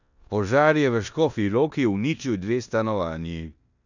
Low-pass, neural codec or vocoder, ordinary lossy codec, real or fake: 7.2 kHz; codec, 16 kHz in and 24 kHz out, 0.9 kbps, LongCat-Audio-Codec, four codebook decoder; none; fake